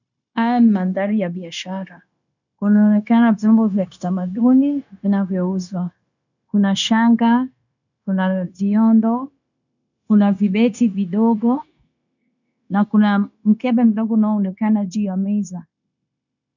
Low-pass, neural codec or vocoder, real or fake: 7.2 kHz; codec, 16 kHz, 0.9 kbps, LongCat-Audio-Codec; fake